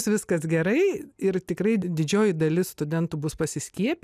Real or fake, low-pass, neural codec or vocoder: real; 14.4 kHz; none